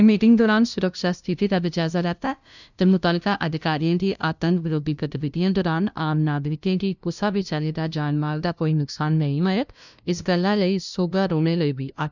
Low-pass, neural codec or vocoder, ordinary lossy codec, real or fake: 7.2 kHz; codec, 16 kHz, 0.5 kbps, FunCodec, trained on LibriTTS, 25 frames a second; none; fake